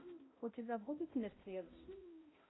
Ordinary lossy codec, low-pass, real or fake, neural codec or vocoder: AAC, 16 kbps; 7.2 kHz; fake; codec, 16 kHz, 0.5 kbps, X-Codec, HuBERT features, trained on balanced general audio